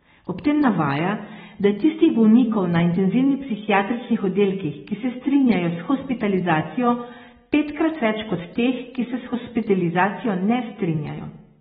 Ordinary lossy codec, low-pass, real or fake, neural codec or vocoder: AAC, 16 kbps; 10.8 kHz; real; none